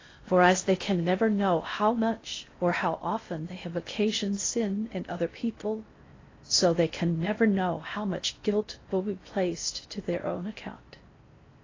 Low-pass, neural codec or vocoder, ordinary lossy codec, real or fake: 7.2 kHz; codec, 16 kHz in and 24 kHz out, 0.6 kbps, FocalCodec, streaming, 4096 codes; AAC, 32 kbps; fake